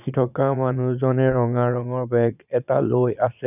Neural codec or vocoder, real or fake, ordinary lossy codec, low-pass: vocoder, 22.05 kHz, 80 mel bands, Vocos; fake; none; 3.6 kHz